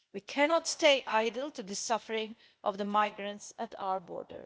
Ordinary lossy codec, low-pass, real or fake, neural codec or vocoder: none; none; fake; codec, 16 kHz, 0.8 kbps, ZipCodec